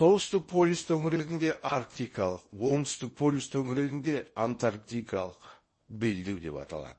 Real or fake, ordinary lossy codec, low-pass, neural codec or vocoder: fake; MP3, 32 kbps; 9.9 kHz; codec, 16 kHz in and 24 kHz out, 0.8 kbps, FocalCodec, streaming, 65536 codes